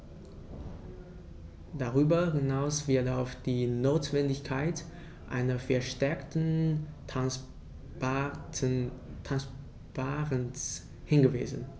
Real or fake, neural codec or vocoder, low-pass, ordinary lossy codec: real; none; none; none